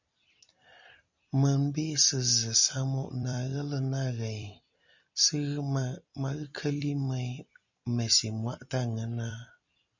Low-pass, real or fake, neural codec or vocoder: 7.2 kHz; fake; vocoder, 44.1 kHz, 128 mel bands every 256 samples, BigVGAN v2